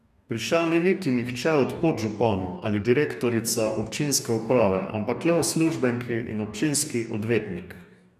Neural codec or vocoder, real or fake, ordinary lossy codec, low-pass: codec, 44.1 kHz, 2.6 kbps, DAC; fake; none; 14.4 kHz